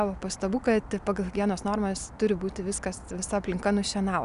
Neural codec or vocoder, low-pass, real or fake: none; 10.8 kHz; real